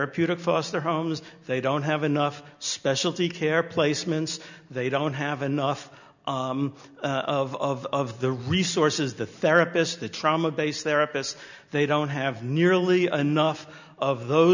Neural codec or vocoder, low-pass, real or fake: none; 7.2 kHz; real